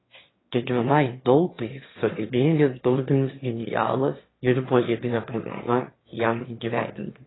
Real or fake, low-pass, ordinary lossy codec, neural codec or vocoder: fake; 7.2 kHz; AAC, 16 kbps; autoencoder, 22.05 kHz, a latent of 192 numbers a frame, VITS, trained on one speaker